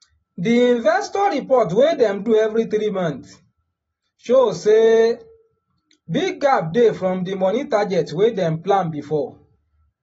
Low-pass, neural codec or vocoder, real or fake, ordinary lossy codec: 19.8 kHz; none; real; AAC, 24 kbps